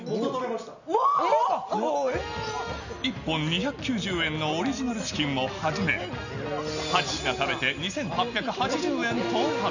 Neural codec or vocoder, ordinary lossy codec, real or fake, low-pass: none; none; real; 7.2 kHz